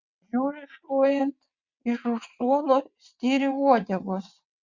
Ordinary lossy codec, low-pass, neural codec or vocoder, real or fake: Opus, 64 kbps; 7.2 kHz; vocoder, 22.05 kHz, 80 mel bands, WaveNeXt; fake